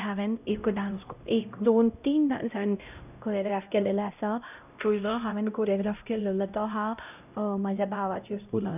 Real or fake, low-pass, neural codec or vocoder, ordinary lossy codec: fake; 3.6 kHz; codec, 16 kHz, 0.5 kbps, X-Codec, HuBERT features, trained on LibriSpeech; none